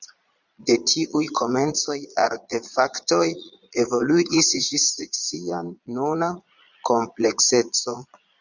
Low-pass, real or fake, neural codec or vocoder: 7.2 kHz; fake; vocoder, 22.05 kHz, 80 mel bands, Vocos